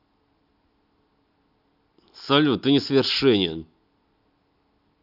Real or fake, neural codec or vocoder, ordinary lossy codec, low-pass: real; none; none; 5.4 kHz